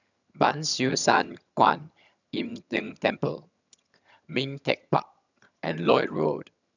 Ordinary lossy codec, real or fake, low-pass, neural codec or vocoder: none; fake; 7.2 kHz; vocoder, 22.05 kHz, 80 mel bands, HiFi-GAN